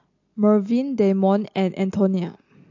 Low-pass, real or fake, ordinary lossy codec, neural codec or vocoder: 7.2 kHz; real; AAC, 48 kbps; none